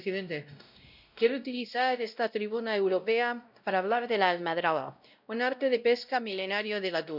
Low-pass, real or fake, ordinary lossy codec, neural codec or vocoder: 5.4 kHz; fake; none; codec, 16 kHz, 0.5 kbps, X-Codec, WavLM features, trained on Multilingual LibriSpeech